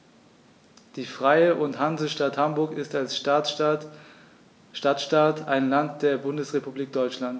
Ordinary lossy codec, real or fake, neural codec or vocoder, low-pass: none; real; none; none